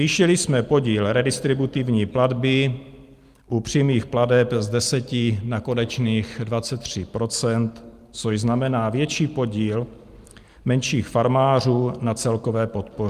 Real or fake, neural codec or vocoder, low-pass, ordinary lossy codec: real; none; 14.4 kHz; Opus, 32 kbps